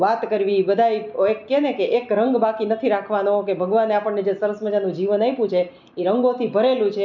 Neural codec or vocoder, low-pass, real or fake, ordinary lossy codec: none; 7.2 kHz; real; none